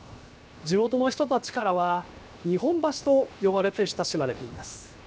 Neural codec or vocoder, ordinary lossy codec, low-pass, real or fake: codec, 16 kHz, 0.7 kbps, FocalCodec; none; none; fake